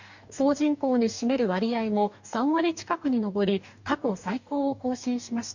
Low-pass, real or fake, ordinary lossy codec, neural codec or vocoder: 7.2 kHz; fake; none; codec, 44.1 kHz, 2.6 kbps, DAC